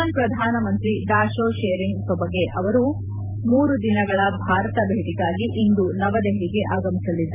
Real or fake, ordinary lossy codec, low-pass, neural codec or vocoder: fake; none; 3.6 kHz; vocoder, 44.1 kHz, 128 mel bands every 512 samples, BigVGAN v2